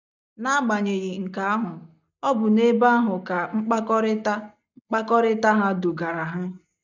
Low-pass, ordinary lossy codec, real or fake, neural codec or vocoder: 7.2 kHz; none; real; none